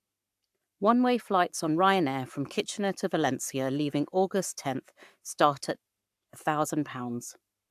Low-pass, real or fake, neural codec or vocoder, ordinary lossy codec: 14.4 kHz; fake; codec, 44.1 kHz, 7.8 kbps, Pupu-Codec; AAC, 96 kbps